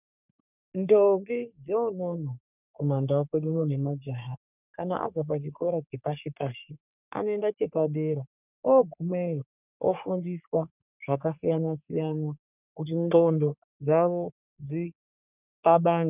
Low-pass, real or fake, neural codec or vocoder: 3.6 kHz; fake; codec, 44.1 kHz, 3.4 kbps, Pupu-Codec